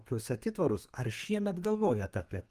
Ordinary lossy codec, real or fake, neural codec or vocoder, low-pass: Opus, 32 kbps; fake; codec, 32 kHz, 1.9 kbps, SNAC; 14.4 kHz